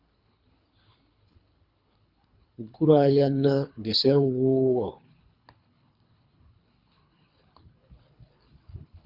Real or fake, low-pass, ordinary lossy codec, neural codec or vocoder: fake; 5.4 kHz; Opus, 64 kbps; codec, 24 kHz, 3 kbps, HILCodec